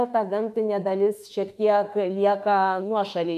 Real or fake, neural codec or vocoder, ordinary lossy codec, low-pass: fake; autoencoder, 48 kHz, 32 numbers a frame, DAC-VAE, trained on Japanese speech; MP3, 96 kbps; 14.4 kHz